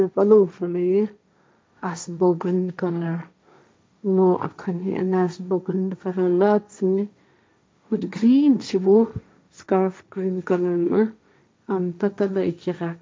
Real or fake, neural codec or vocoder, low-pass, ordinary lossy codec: fake; codec, 16 kHz, 1.1 kbps, Voila-Tokenizer; none; none